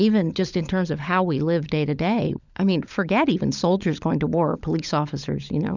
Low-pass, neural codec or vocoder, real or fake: 7.2 kHz; codec, 16 kHz, 16 kbps, FunCodec, trained on LibriTTS, 50 frames a second; fake